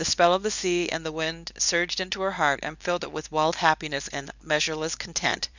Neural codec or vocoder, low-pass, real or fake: codec, 24 kHz, 0.9 kbps, WavTokenizer, medium speech release version 2; 7.2 kHz; fake